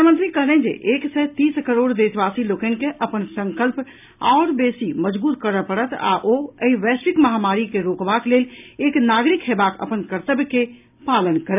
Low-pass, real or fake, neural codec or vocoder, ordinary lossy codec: 3.6 kHz; real; none; none